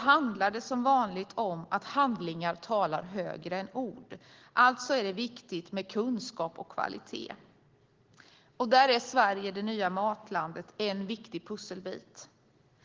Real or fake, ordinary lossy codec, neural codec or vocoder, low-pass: real; Opus, 16 kbps; none; 7.2 kHz